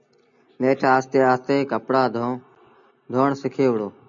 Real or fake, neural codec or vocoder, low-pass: real; none; 7.2 kHz